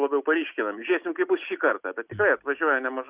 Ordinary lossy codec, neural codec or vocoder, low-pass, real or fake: Opus, 64 kbps; none; 3.6 kHz; real